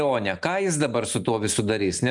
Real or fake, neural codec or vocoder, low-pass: real; none; 10.8 kHz